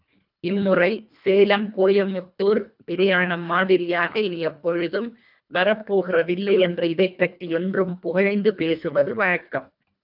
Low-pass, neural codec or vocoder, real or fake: 5.4 kHz; codec, 24 kHz, 1.5 kbps, HILCodec; fake